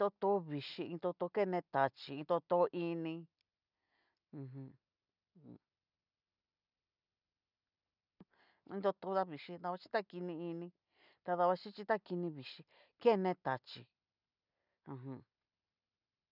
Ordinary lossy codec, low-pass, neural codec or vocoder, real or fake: none; 5.4 kHz; none; real